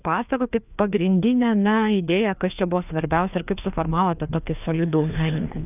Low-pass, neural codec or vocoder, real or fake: 3.6 kHz; codec, 16 kHz, 2 kbps, FreqCodec, larger model; fake